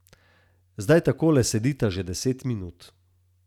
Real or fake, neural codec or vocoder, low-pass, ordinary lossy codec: real; none; 19.8 kHz; none